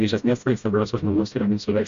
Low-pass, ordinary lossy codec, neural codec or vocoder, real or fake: 7.2 kHz; MP3, 64 kbps; codec, 16 kHz, 0.5 kbps, FreqCodec, smaller model; fake